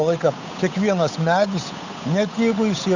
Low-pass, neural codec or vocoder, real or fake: 7.2 kHz; codec, 16 kHz, 8 kbps, FunCodec, trained on Chinese and English, 25 frames a second; fake